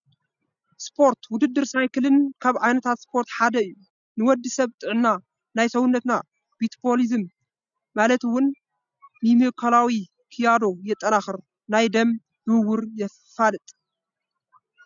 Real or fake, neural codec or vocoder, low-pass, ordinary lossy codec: real; none; 7.2 kHz; MP3, 96 kbps